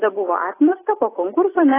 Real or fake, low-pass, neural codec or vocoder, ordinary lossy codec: real; 3.6 kHz; none; AAC, 16 kbps